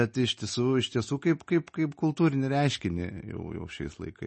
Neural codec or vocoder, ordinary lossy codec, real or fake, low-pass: none; MP3, 32 kbps; real; 10.8 kHz